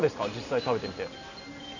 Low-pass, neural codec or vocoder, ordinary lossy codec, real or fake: 7.2 kHz; none; none; real